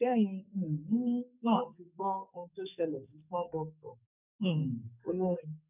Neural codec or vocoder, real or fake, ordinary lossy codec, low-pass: codec, 44.1 kHz, 2.6 kbps, SNAC; fake; none; 3.6 kHz